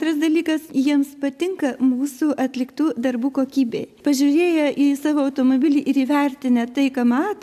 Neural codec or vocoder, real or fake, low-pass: none; real; 14.4 kHz